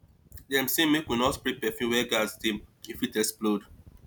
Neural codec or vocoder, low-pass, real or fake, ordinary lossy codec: none; none; real; none